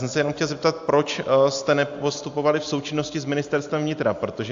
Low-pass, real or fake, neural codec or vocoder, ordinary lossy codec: 7.2 kHz; real; none; AAC, 64 kbps